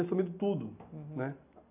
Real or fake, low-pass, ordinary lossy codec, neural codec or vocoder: real; 3.6 kHz; AAC, 24 kbps; none